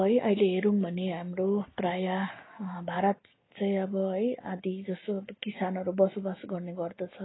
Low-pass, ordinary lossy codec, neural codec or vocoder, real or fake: 7.2 kHz; AAC, 16 kbps; none; real